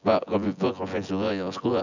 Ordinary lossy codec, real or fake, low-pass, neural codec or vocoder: none; fake; 7.2 kHz; vocoder, 24 kHz, 100 mel bands, Vocos